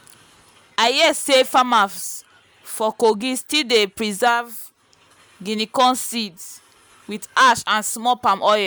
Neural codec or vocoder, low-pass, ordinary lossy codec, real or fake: none; none; none; real